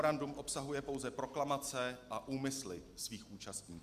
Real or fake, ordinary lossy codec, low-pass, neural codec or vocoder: real; Opus, 64 kbps; 14.4 kHz; none